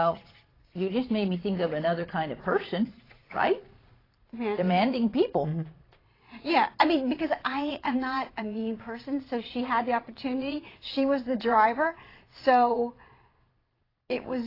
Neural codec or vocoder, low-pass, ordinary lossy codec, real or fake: vocoder, 22.05 kHz, 80 mel bands, Vocos; 5.4 kHz; AAC, 24 kbps; fake